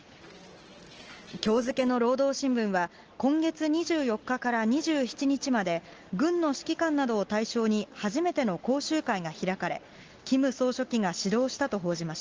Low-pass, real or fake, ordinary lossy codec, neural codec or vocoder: 7.2 kHz; real; Opus, 16 kbps; none